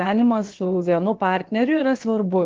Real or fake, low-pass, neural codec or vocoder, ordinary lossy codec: fake; 7.2 kHz; codec, 16 kHz, about 1 kbps, DyCAST, with the encoder's durations; Opus, 16 kbps